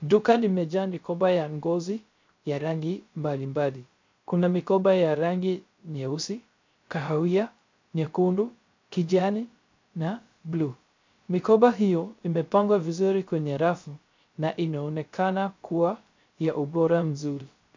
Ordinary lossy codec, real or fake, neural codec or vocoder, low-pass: MP3, 64 kbps; fake; codec, 16 kHz, 0.3 kbps, FocalCodec; 7.2 kHz